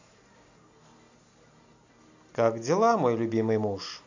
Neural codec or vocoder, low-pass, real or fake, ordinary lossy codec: none; 7.2 kHz; real; AAC, 48 kbps